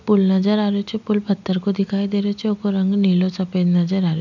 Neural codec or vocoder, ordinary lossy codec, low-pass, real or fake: none; none; 7.2 kHz; real